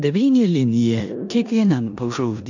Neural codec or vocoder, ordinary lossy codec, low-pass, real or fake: codec, 16 kHz in and 24 kHz out, 0.9 kbps, LongCat-Audio-Codec, four codebook decoder; AAC, 48 kbps; 7.2 kHz; fake